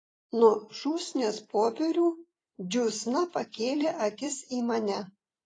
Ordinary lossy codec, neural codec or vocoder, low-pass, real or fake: AAC, 32 kbps; vocoder, 44.1 kHz, 128 mel bands every 256 samples, BigVGAN v2; 9.9 kHz; fake